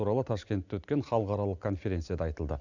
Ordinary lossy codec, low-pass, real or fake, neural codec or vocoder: none; 7.2 kHz; real; none